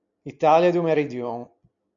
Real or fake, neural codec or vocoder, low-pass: real; none; 7.2 kHz